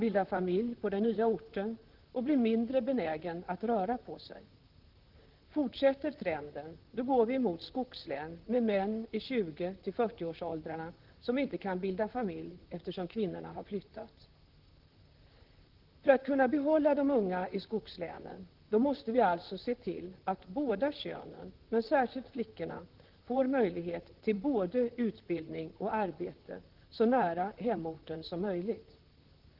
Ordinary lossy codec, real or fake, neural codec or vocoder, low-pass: Opus, 16 kbps; fake; vocoder, 44.1 kHz, 128 mel bands, Pupu-Vocoder; 5.4 kHz